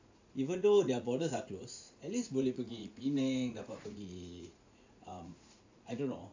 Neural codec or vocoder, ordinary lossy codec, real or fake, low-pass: vocoder, 44.1 kHz, 80 mel bands, Vocos; AAC, 48 kbps; fake; 7.2 kHz